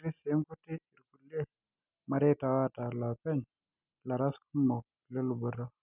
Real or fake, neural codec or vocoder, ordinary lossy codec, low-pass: real; none; none; 3.6 kHz